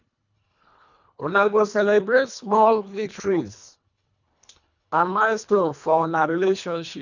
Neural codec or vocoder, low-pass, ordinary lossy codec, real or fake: codec, 24 kHz, 1.5 kbps, HILCodec; 7.2 kHz; none; fake